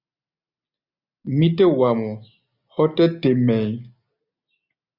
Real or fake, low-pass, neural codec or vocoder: real; 5.4 kHz; none